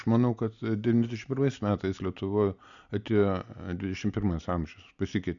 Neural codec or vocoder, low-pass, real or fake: none; 7.2 kHz; real